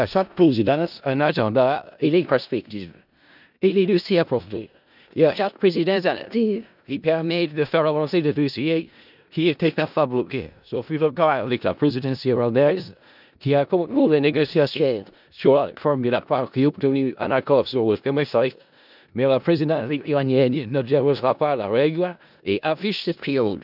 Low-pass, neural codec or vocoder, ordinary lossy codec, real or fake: 5.4 kHz; codec, 16 kHz in and 24 kHz out, 0.4 kbps, LongCat-Audio-Codec, four codebook decoder; none; fake